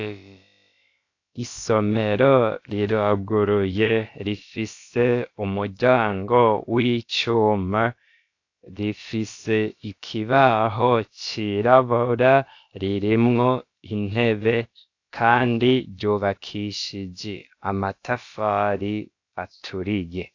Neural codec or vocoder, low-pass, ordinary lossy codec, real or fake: codec, 16 kHz, about 1 kbps, DyCAST, with the encoder's durations; 7.2 kHz; AAC, 48 kbps; fake